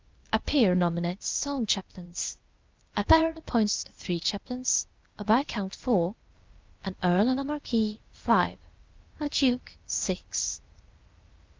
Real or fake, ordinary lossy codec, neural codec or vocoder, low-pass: fake; Opus, 32 kbps; codec, 16 kHz, 0.8 kbps, ZipCodec; 7.2 kHz